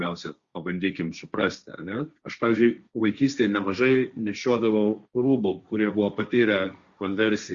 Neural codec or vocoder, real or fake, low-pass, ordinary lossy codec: codec, 16 kHz, 1.1 kbps, Voila-Tokenizer; fake; 7.2 kHz; Opus, 64 kbps